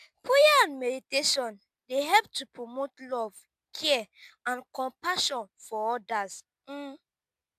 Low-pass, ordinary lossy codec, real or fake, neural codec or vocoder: 14.4 kHz; MP3, 96 kbps; real; none